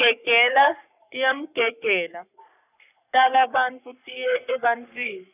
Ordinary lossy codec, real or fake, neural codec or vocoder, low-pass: none; fake; codec, 44.1 kHz, 3.4 kbps, Pupu-Codec; 3.6 kHz